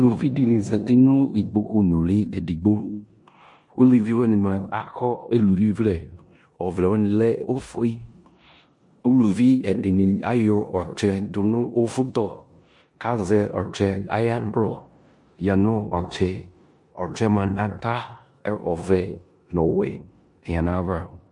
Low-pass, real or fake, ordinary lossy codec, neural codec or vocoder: 10.8 kHz; fake; MP3, 48 kbps; codec, 16 kHz in and 24 kHz out, 0.9 kbps, LongCat-Audio-Codec, four codebook decoder